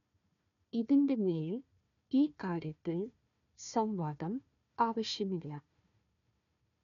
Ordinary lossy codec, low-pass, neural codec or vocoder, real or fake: none; 7.2 kHz; codec, 16 kHz, 1 kbps, FunCodec, trained on Chinese and English, 50 frames a second; fake